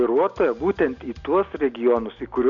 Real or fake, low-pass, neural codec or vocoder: real; 7.2 kHz; none